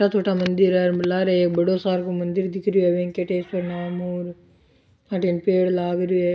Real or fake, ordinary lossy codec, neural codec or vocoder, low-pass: real; none; none; none